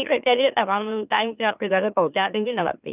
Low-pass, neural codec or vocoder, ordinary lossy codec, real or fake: 3.6 kHz; autoencoder, 44.1 kHz, a latent of 192 numbers a frame, MeloTTS; none; fake